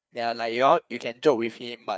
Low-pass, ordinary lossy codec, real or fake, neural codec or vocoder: none; none; fake; codec, 16 kHz, 2 kbps, FreqCodec, larger model